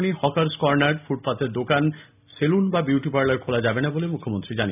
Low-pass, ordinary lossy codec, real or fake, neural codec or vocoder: 3.6 kHz; none; real; none